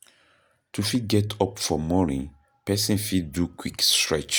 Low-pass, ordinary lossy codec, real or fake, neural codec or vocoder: none; none; real; none